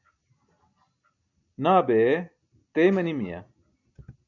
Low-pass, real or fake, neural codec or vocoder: 7.2 kHz; real; none